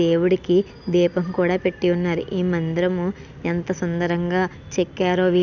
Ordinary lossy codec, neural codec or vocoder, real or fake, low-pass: none; none; real; 7.2 kHz